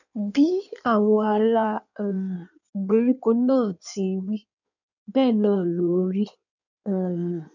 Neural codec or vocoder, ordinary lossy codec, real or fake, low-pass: codec, 16 kHz in and 24 kHz out, 1.1 kbps, FireRedTTS-2 codec; none; fake; 7.2 kHz